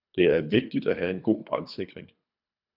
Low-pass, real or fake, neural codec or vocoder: 5.4 kHz; fake; codec, 24 kHz, 3 kbps, HILCodec